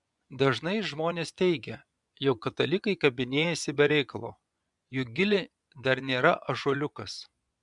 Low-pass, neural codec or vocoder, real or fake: 10.8 kHz; vocoder, 24 kHz, 100 mel bands, Vocos; fake